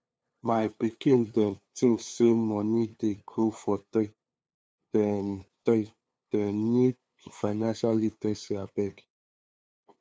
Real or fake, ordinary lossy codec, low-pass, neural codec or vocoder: fake; none; none; codec, 16 kHz, 2 kbps, FunCodec, trained on LibriTTS, 25 frames a second